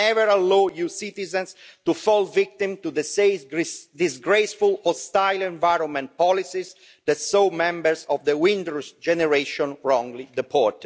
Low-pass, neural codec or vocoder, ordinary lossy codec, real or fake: none; none; none; real